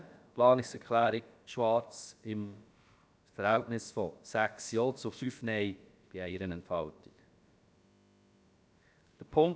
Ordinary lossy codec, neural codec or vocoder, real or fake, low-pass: none; codec, 16 kHz, about 1 kbps, DyCAST, with the encoder's durations; fake; none